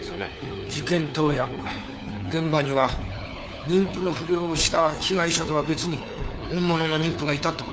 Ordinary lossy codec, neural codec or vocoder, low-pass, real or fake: none; codec, 16 kHz, 4 kbps, FunCodec, trained on LibriTTS, 50 frames a second; none; fake